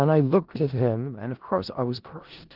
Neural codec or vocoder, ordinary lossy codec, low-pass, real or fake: codec, 16 kHz in and 24 kHz out, 0.4 kbps, LongCat-Audio-Codec, four codebook decoder; Opus, 16 kbps; 5.4 kHz; fake